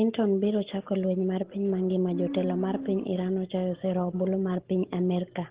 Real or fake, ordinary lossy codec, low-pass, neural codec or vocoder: real; Opus, 16 kbps; 3.6 kHz; none